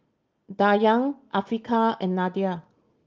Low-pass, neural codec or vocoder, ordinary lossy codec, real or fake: 7.2 kHz; none; Opus, 24 kbps; real